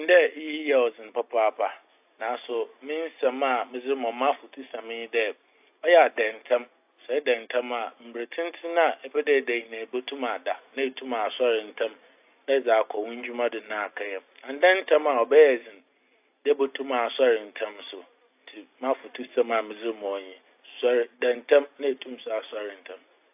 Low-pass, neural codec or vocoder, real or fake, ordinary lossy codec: 3.6 kHz; none; real; AAC, 32 kbps